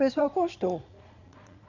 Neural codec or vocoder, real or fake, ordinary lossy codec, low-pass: vocoder, 22.05 kHz, 80 mel bands, Vocos; fake; none; 7.2 kHz